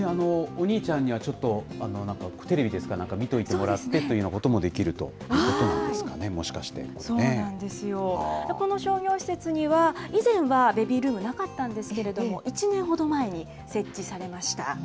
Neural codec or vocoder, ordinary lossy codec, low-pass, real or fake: none; none; none; real